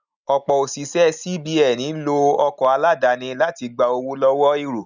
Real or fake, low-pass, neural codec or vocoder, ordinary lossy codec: real; 7.2 kHz; none; none